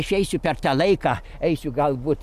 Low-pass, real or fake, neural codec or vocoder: 14.4 kHz; real; none